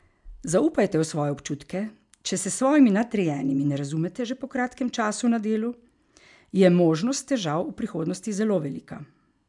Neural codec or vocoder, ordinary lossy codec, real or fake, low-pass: none; MP3, 96 kbps; real; 10.8 kHz